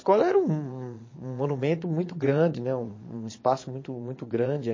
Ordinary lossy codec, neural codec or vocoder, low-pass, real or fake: MP3, 32 kbps; vocoder, 22.05 kHz, 80 mel bands, Vocos; 7.2 kHz; fake